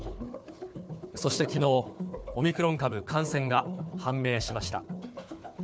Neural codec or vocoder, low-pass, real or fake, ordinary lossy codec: codec, 16 kHz, 4 kbps, FunCodec, trained on Chinese and English, 50 frames a second; none; fake; none